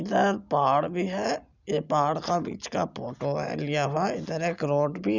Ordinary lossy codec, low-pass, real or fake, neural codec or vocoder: Opus, 64 kbps; 7.2 kHz; real; none